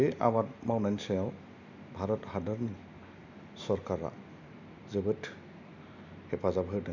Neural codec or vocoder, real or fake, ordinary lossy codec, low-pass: none; real; none; 7.2 kHz